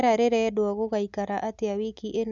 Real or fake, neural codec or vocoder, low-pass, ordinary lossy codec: real; none; 7.2 kHz; none